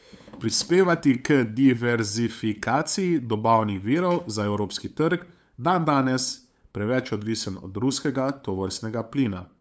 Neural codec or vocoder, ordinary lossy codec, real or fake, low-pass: codec, 16 kHz, 8 kbps, FunCodec, trained on LibriTTS, 25 frames a second; none; fake; none